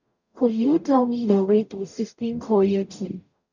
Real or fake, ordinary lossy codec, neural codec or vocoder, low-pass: fake; none; codec, 44.1 kHz, 0.9 kbps, DAC; 7.2 kHz